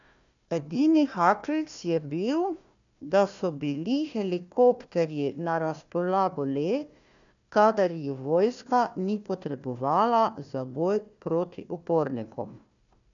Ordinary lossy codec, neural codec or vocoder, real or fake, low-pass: none; codec, 16 kHz, 1 kbps, FunCodec, trained on Chinese and English, 50 frames a second; fake; 7.2 kHz